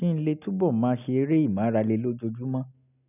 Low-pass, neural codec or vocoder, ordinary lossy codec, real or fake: 3.6 kHz; none; none; real